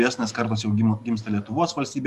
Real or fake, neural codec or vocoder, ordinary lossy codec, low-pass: real; none; Opus, 64 kbps; 14.4 kHz